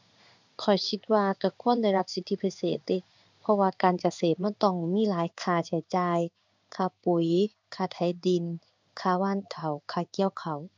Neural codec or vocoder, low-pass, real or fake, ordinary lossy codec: codec, 16 kHz in and 24 kHz out, 1 kbps, XY-Tokenizer; 7.2 kHz; fake; MP3, 64 kbps